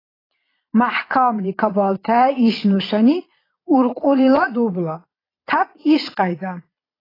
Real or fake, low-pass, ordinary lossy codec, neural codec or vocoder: fake; 5.4 kHz; AAC, 24 kbps; vocoder, 22.05 kHz, 80 mel bands, Vocos